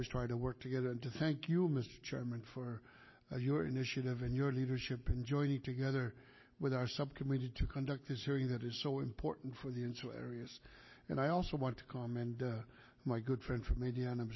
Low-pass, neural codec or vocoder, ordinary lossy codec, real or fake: 7.2 kHz; none; MP3, 24 kbps; real